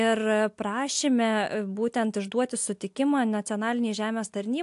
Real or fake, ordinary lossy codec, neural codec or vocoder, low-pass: real; AAC, 64 kbps; none; 10.8 kHz